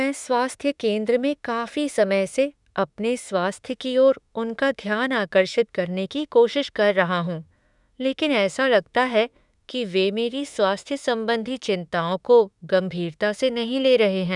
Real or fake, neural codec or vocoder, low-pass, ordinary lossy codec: fake; autoencoder, 48 kHz, 32 numbers a frame, DAC-VAE, trained on Japanese speech; 10.8 kHz; none